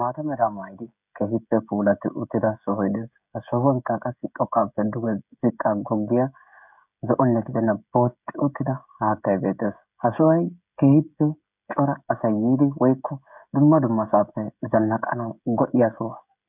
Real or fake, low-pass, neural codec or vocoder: fake; 3.6 kHz; codec, 16 kHz, 16 kbps, FreqCodec, smaller model